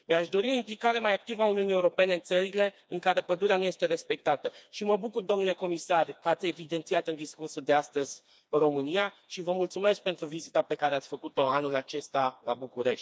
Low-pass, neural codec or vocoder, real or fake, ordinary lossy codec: none; codec, 16 kHz, 2 kbps, FreqCodec, smaller model; fake; none